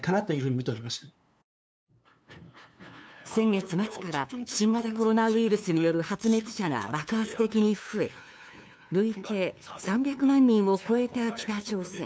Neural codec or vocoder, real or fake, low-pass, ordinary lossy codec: codec, 16 kHz, 2 kbps, FunCodec, trained on LibriTTS, 25 frames a second; fake; none; none